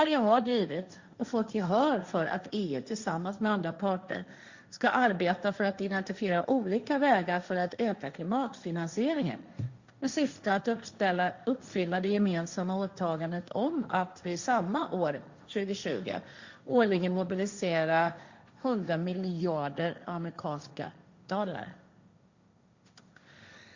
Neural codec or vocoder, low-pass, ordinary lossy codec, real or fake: codec, 16 kHz, 1.1 kbps, Voila-Tokenizer; 7.2 kHz; Opus, 64 kbps; fake